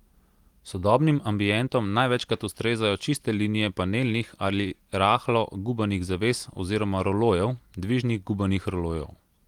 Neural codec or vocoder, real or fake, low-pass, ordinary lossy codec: none; real; 19.8 kHz; Opus, 24 kbps